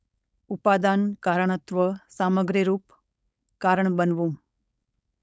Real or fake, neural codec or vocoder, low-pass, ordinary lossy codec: fake; codec, 16 kHz, 4.8 kbps, FACodec; none; none